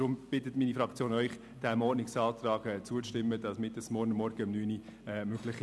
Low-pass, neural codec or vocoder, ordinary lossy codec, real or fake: none; none; none; real